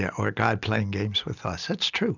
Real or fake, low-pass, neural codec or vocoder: real; 7.2 kHz; none